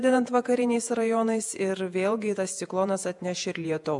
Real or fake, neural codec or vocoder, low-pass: fake; vocoder, 48 kHz, 128 mel bands, Vocos; 10.8 kHz